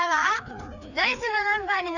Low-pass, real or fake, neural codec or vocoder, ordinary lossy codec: 7.2 kHz; fake; codec, 16 kHz, 2 kbps, FreqCodec, larger model; none